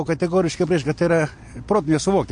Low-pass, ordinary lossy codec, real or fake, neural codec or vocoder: 9.9 kHz; MP3, 48 kbps; real; none